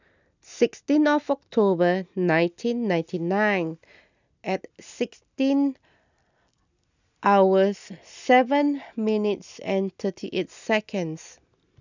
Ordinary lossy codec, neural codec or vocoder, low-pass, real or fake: none; none; 7.2 kHz; real